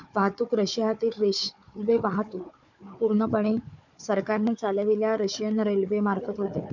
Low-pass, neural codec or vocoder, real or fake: 7.2 kHz; codec, 16 kHz, 4 kbps, FunCodec, trained on Chinese and English, 50 frames a second; fake